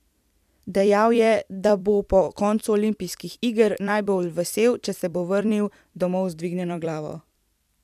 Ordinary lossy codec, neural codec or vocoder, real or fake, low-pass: none; vocoder, 44.1 kHz, 128 mel bands every 256 samples, BigVGAN v2; fake; 14.4 kHz